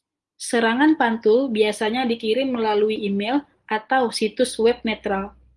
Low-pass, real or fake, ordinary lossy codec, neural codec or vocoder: 10.8 kHz; real; Opus, 24 kbps; none